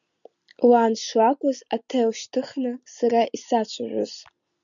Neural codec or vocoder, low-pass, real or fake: none; 7.2 kHz; real